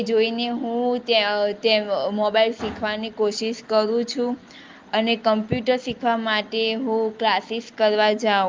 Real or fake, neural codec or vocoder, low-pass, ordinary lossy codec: real; none; 7.2 kHz; Opus, 32 kbps